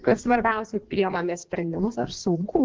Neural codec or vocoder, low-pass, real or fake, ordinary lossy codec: codec, 16 kHz in and 24 kHz out, 1.1 kbps, FireRedTTS-2 codec; 7.2 kHz; fake; Opus, 16 kbps